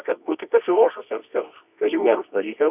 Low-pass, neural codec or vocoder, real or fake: 3.6 kHz; codec, 24 kHz, 0.9 kbps, WavTokenizer, medium music audio release; fake